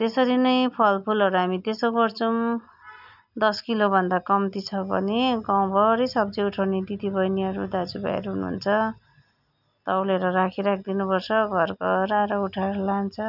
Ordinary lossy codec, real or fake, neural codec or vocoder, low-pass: none; real; none; 5.4 kHz